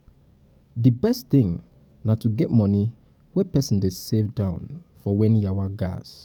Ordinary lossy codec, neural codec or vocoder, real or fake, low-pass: none; codec, 44.1 kHz, 7.8 kbps, DAC; fake; 19.8 kHz